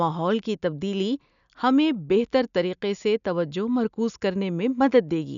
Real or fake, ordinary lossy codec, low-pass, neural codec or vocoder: real; none; 7.2 kHz; none